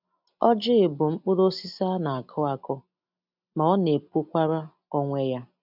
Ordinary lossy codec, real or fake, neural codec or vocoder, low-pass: none; real; none; 5.4 kHz